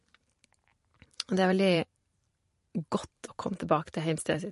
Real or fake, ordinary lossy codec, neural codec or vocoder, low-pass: fake; MP3, 48 kbps; vocoder, 44.1 kHz, 128 mel bands every 512 samples, BigVGAN v2; 14.4 kHz